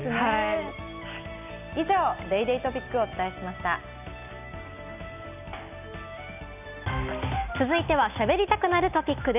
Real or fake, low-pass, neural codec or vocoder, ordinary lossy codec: real; 3.6 kHz; none; none